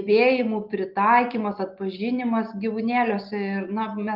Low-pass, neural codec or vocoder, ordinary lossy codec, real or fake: 5.4 kHz; none; Opus, 24 kbps; real